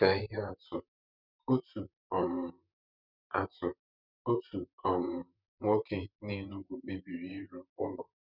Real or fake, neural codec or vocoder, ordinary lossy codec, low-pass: fake; vocoder, 44.1 kHz, 128 mel bands, Pupu-Vocoder; none; 5.4 kHz